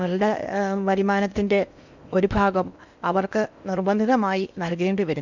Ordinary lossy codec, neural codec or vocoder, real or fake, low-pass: none; codec, 16 kHz in and 24 kHz out, 0.8 kbps, FocalCodec, streaming, 65536 codes; fake; 7.2 kHz